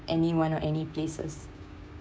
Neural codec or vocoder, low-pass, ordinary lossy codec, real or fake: codec, 16 kHz, 6 kbps, DAC; none; none; fake